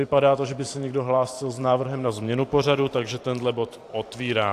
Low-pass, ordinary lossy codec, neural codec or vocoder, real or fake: 14.4 kHz; AAC, 64 kbps; vocoder, 44.1 kHz, 128 mel bands every 512 samples, BigVGAN v2; fake